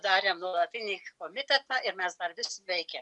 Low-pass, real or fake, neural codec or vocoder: 10.8 kHz; real; none